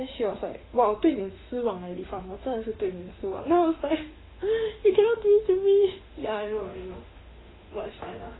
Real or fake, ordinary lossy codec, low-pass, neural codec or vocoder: fake; AAC, 16 kbps; 7.2 kHz; autoencoder, 48 kHz, 32 numbers a frame, DAC-VAE, trained on Japanese speech